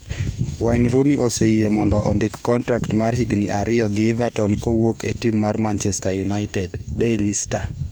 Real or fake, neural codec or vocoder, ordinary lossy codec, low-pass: fake; codec, 44.1 kHz, 2.6 kbps, DAC; none; none